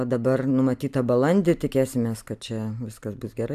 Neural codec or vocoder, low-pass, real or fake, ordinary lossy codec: none; 14.4 kHz; real; AAC, 96 kbps